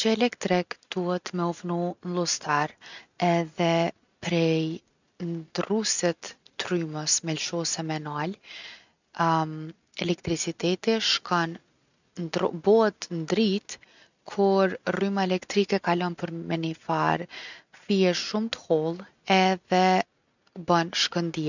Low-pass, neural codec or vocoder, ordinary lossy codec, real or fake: 7.2 kHz; none; none; real